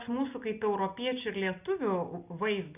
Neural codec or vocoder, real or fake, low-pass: none; real; 3.6 kHz